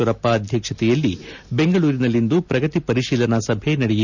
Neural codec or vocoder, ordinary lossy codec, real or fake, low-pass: none; none; real; 7.2 kHz